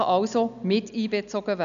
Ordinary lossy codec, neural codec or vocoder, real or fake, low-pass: none; none; real; 7.2 kHz